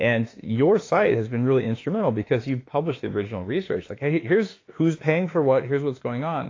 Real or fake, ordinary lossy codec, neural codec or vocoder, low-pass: fake; AAC, 32 kbps; autoencoder, 48 kHz, 32 numbers a frame, DAC-VAE, trained on Japanese speech; 7.2 kHz